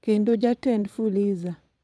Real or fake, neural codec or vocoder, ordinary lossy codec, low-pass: fake; vocoder, 22.05 kHz, 80 mel bands, Vocos; none; none